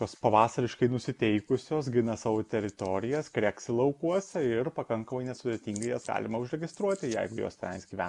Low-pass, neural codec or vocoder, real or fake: 10.8 kHz; none; real